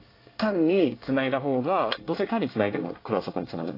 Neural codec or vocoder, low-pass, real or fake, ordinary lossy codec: codec, 24 kHz, 1 kbps, SNAC; 5.4 kHz; fake; AAC, 32 kbps